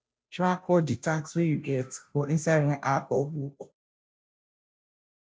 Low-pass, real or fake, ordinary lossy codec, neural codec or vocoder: none; fake; none; codec, 16 kHz, 0.5 kbps, FunCodec, trained on Chinese and English, 25 frames a second